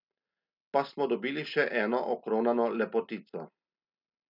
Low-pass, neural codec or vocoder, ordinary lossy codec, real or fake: 5.4 kHz; none; none; real